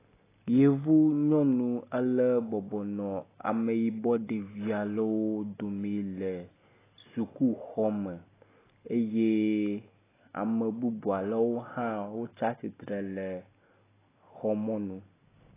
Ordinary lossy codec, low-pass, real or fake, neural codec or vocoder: AAC, 16 kbps; 3.6 kHz; real; none